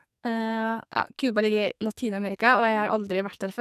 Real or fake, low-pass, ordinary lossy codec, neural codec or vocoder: fake; 14.4 kHz; none; codec, 44.1 kHz, 2.6 kbps, SNAC